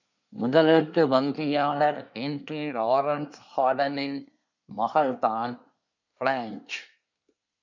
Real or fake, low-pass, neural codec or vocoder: fake; 7.2 kHz; codec, 24 kHz, 1 kbps, SNAC